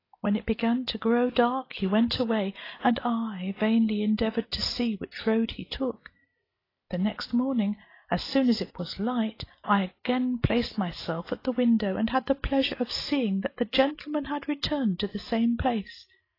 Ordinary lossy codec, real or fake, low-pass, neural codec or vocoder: AAC, 24 kbps; real; 5.4 kHz; none